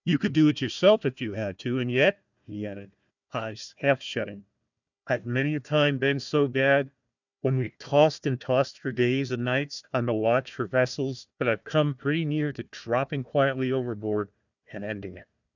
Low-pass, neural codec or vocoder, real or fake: 7.2 kHz; codec, 16 kHz, 1 kbps, FunCodec, trained on Chinese and English, 50 frames a second; fake